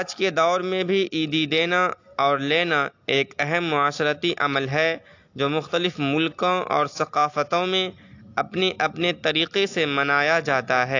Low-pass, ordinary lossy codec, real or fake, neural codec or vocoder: 7.2 kHz; AAC, 48 kbps; real; none